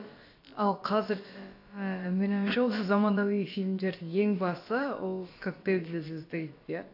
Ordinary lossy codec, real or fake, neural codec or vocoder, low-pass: AAC, 32 kbps; fake; codec, 16 kHz, about 1 kbps, DyCAST, with the encoder's durations; 5.4 kHz